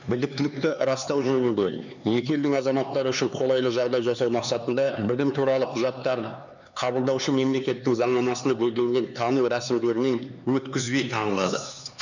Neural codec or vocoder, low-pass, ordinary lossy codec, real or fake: codec, 16 kHz, 4 kbps, X-Codec, HuBERT features, trained on LibriSpeech; 7.2 kHz; none; fake